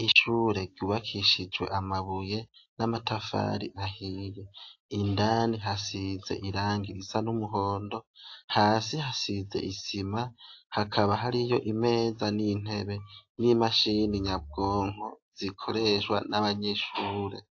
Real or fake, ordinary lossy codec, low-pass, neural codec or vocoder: real; AAC, 48 kbps; 7.2 kHz; none